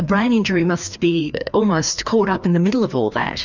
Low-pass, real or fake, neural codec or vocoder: 7.2 kHz; fake; codec, 16 kHz, 2 kbps, FreqCodec, larger model